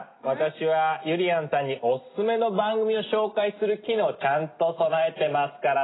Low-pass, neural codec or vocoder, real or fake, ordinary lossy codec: 7.2 kHz; none; real; AAC, 16 kbps